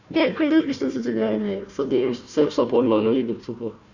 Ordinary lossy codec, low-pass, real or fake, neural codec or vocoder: none; 7.2 kHz; fake; codec, 16 kHz, 1 kbps, FunCodec, trained on Chinese and English, 50 frames a second